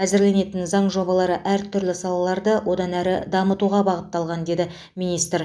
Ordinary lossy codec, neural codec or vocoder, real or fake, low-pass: none; none; real; none